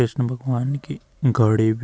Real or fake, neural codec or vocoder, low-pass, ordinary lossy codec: real; none; none; none